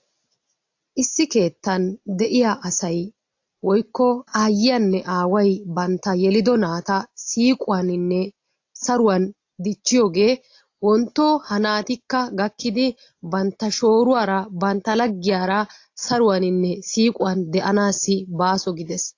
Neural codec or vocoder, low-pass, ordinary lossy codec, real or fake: none; 7.2 kHz; AAC, 48 kbps; real